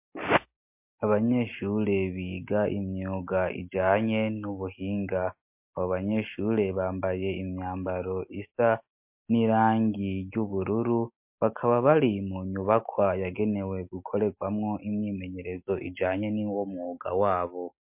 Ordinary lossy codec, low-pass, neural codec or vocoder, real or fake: MP3, 32 kbps; 3.6 kHz; none; real